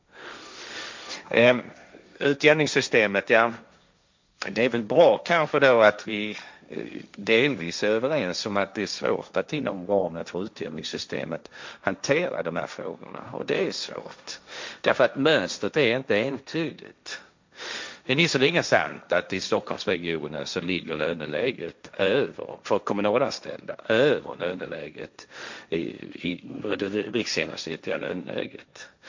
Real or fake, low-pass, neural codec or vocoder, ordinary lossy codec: fake; 7.2 kHz; codec, 16 kHz, 1.1 kbps, Voila-Tokenizer; MP3, 64 kbps